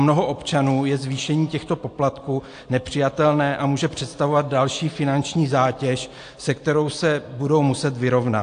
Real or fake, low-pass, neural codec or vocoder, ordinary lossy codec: real; 9.9 kHz; none; AAC, 48 kbps